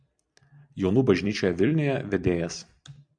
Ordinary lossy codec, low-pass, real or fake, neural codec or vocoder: MP3, 96 kbps; 9.9 kHz; fake; vocoder, 44.1 kHz, 128 mel bands every 256 samples, BigVGAN v2